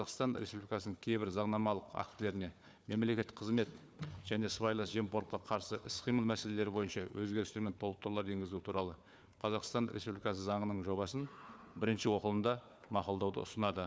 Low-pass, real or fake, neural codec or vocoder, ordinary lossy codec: none; fake; codec, 16 kHz, 4 kbps, FunCodec, trained on Chinese and English, 50 frames a second; none